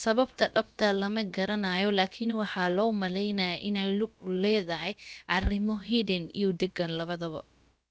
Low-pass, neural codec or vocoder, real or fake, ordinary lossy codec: none; codec, 16 kHz, about 1 kbps, DyCAST, with the encoder's durations; fake; none